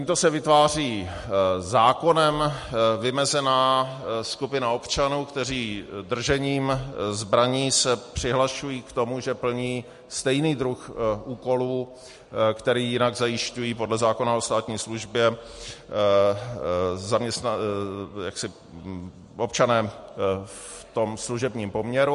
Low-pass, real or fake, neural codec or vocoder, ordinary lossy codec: 14.4 kHz; real; none; MP3, 48 kbps